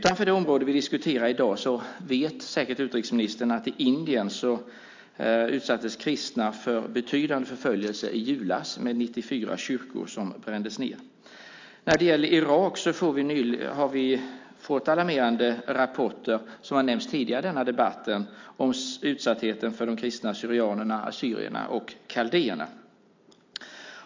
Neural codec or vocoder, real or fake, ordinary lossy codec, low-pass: none; real; MP3, 64 kbps; 7.2 kHz